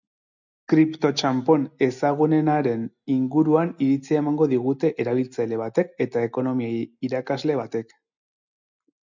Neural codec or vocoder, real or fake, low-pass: none; real; 7.2 kHz